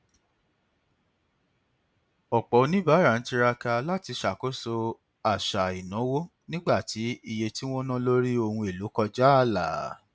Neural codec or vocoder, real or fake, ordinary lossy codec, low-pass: none; real; none; none